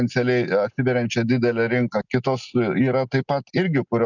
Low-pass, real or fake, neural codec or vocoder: 7.2 kHz; real; none